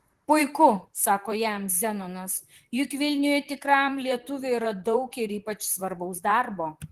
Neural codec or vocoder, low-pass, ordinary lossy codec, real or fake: vocoder, 44.1 kHz, 128 mel bands, Pupu-Vocoder; 14.4 kHz; Opus, 16 kbps; fake